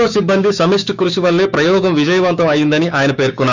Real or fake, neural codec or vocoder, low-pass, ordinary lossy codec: fake; codec, 16 kHz, 6 kbps, DAC; 7.2 kHz; none